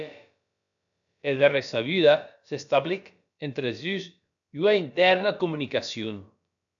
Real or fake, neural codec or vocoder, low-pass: fake; codec, 16 kHz, about 1 kbps, DyCAST, with the encoder's durations; 7.2 kHz